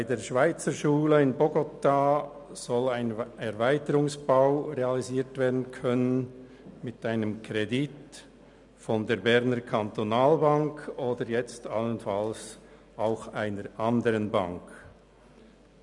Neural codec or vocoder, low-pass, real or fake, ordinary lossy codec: none; 10.8 kHz; real; none